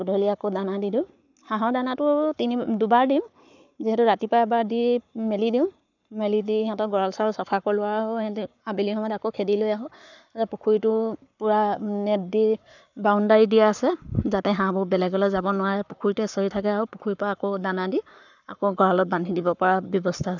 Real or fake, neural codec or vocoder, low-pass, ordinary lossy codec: fake; codec, 44.1 kHz, 7.8 kbps, Pupu-Codec; 7.2 kHz; none